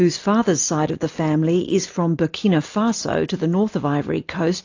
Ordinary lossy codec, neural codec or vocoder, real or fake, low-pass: AAC, 48 kbps; none; real; 7.2 kHz